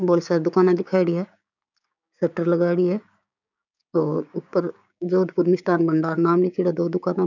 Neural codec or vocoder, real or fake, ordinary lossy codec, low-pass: codec, 16 kHz, 6 kbps, DAC; fake; none; 7.2 kHz